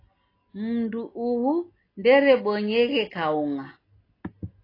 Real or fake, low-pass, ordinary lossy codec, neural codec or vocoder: real; 5.4 kHz; AAC, 24 kbps; none